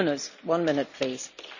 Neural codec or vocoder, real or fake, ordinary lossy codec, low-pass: none; real; none; 7.2 kHz